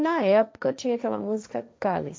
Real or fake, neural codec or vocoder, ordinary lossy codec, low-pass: fake; codec, 16 kHz, 1.1 kbps, Voila-Tokenizer; none; none